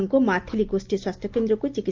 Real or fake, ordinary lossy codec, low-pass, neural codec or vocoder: real; Opus, 32 kbps; 7.2 kHz; none